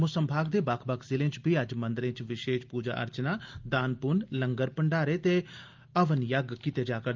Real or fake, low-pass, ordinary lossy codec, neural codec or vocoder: real; 7.2 kHz; Opus, 24 kbps; none